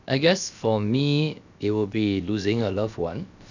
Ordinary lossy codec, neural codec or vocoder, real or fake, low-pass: none; codec, 16 kHz, about 1 kbps, DyCAST, with the encoder's durations; fake; 7.2 kHz